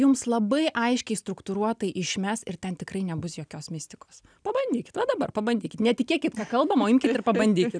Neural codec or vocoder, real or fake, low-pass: none; real; 9.9 kHz